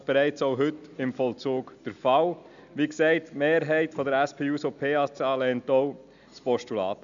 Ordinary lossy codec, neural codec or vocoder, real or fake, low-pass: none; none; real; 7.2 kHz